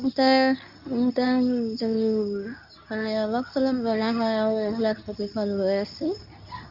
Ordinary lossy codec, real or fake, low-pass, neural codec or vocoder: none; fake; 5.4 kHz; codec, 24 kHz, 0.9 kbps, WavTokenizer, medium speech release version 2